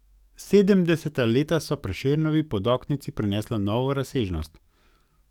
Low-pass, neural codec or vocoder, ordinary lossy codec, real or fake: 19.8 kHz; codec, 44.1 kHz, 7.8 kbps, DAC; none; fake